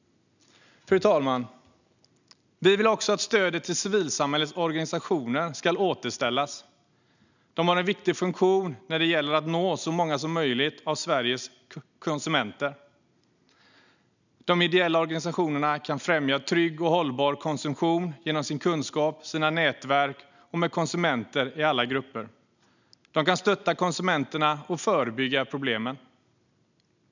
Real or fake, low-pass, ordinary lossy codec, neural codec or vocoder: real; 7.2 kHz; none; none